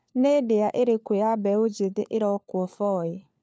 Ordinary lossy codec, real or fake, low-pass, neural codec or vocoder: none; fake; none; codec, 16 kHz, 4 kbps, FunCodec, trained on LibriTTS, 50 frames a second